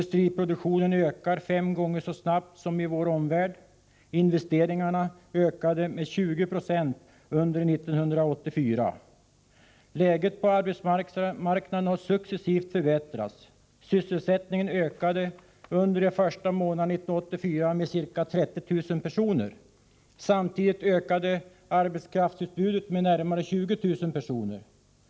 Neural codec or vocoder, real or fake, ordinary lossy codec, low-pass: none; real; none; none